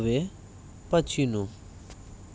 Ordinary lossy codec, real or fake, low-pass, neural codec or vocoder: none; real; none; none